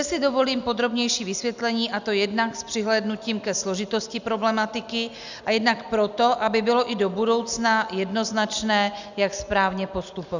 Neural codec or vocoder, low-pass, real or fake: none; 7.2 kHz; real